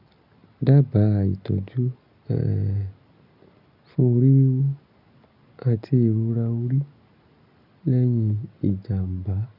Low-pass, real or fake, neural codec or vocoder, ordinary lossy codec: 5.4 kHz; real; none; none